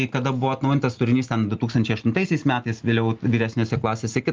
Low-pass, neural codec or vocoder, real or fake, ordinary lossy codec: 7.2 kHz; none; real; Opus, 32 kbps